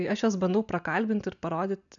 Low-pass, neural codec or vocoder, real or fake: 7.2 kHz; none; real